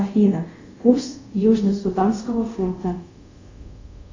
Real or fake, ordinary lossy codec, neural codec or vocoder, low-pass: fake; AAC, 48 kbps; codec, 24 kHz, 0.5 kbps, DualCodec; 7.2 kHz